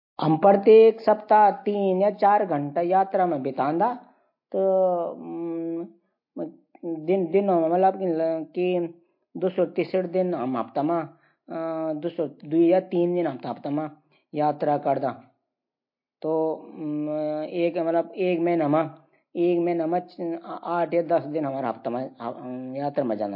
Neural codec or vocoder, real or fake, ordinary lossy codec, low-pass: none; real; MP3, 32 kbps; 5.4 kHz